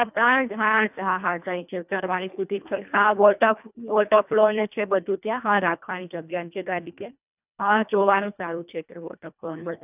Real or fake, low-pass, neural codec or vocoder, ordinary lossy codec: fake; 3.6 kHz; codec, 24 kHz, 1.5 kbps, HILCodec; none